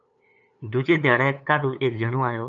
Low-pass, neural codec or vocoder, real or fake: 7.2 kHz; codec, 16 kHz, 8 kbps, FunCodec, trained on LibriTTS, 25 frames a second; fake